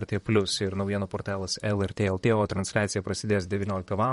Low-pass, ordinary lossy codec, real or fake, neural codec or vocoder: 19.8 kHz; MP3, 48 kbps; fake; vocoder, 44.1 kHz, 128 mel bands, Pupu-Vocoder